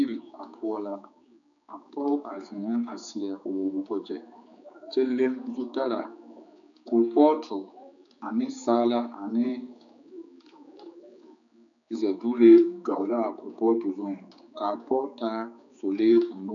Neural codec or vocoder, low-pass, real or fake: codec, 16 kHz, 4 kbps, X-Codec, HuBERT features, trained on general audio; 7.2 kHz; fake